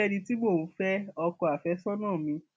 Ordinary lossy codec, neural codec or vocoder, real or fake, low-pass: none; none; real; none